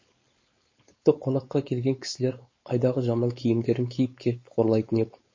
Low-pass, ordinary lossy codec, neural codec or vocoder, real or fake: 7.2 kHz; MP3, 32 kbps; codec, 16 kHz, 4.8 kbps, FACodec; fake